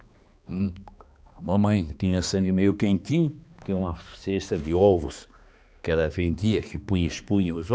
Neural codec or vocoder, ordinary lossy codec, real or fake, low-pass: codec, 16 kHz, 2 kbps, X-Codec, HuBERT features, trained on balanced general audio; none; fake; none